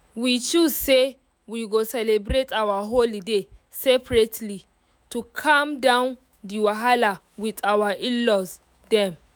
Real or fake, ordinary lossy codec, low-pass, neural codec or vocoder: fake; none; none; autoencoder, 48 kHz, 128 numbers a frame, DAC-VAE, trained on Japanese speech